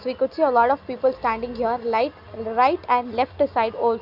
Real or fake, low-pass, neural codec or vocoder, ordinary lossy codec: real; 5.4 kHz; none; Opus, 64 kbps